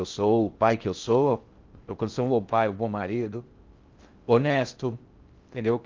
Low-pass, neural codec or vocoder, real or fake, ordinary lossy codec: 7.2 kHz; codec, 16 kHz in and 24 kHz out, 0.6 kbps, FocalCodec, streaming, 4096 codes; fake; Opus, 32 kbps